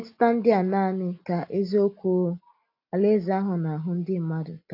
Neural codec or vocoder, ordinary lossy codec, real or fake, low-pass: none; AAC, 32 kbps; real; 5.4 kHz